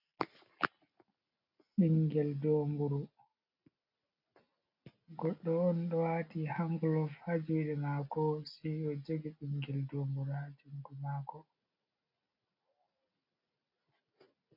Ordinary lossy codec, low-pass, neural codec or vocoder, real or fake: AAC, 48 kbps; 5.4 kHz; none; real